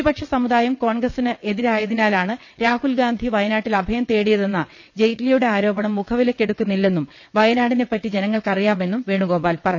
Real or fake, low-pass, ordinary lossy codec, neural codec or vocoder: fake; 7.2 kHz; none; vocoder, 22.05 kHz, 80 mel bands, WaveNeXt